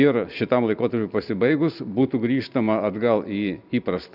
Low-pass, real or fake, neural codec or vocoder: 5.4 kHz; real; none